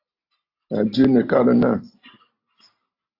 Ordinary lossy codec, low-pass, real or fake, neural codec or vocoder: AAC, 32 kbps; 5.4 kHz; real; none